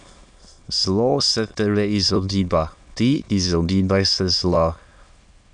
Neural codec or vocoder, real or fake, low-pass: autoencoder, 22.05 kHz, a latent of 192 numbers a frame, VITS, trained on many speakers; fake; 9.9 kHz